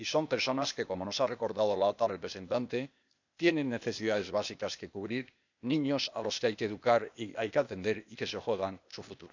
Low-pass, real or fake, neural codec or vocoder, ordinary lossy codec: 7.2 kHz; fake; codec, 16 kHz, 0.8 kbps, ZipCodec; none